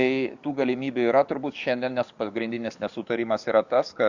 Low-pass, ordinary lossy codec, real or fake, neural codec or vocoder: 7.2 kHz; Opus, 64 kbps; fake; codec, 44.1 kHz, 7.8 kbps, DAC